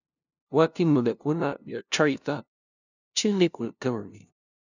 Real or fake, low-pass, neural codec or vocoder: fake; 7.2 kHz; codec, 16 kHz, 0.5 kbps, FunCodec, trained on LibriTTS, 25 frames a second